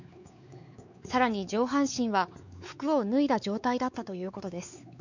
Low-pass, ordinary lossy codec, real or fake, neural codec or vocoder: 7.2 kHz; none; fake; codec, 16 kHz, 4 kbps, X-Codec, WavLM features, trained on Multilingual LibriSpeech